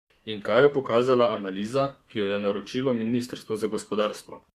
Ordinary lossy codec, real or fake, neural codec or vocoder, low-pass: Opus, 64 kbps; fake; codec, 32 kHz, 1.9 kbps, SNAC; 14.4 kHz